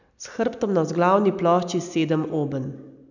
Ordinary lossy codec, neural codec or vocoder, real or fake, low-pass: none; none; real; 7.2 kHz